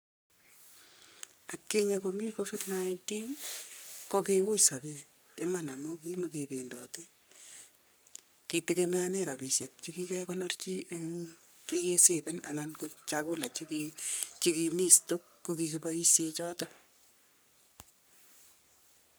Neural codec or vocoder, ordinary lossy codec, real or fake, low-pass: codec, 44.1 kHz, 3.4 kbps, Pupu-Codec; none; fake; none